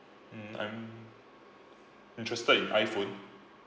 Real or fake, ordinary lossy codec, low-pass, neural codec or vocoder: real; none; none; none